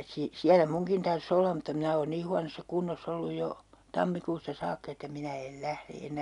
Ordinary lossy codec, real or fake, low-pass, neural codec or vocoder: none; real; 10.8 kHz; none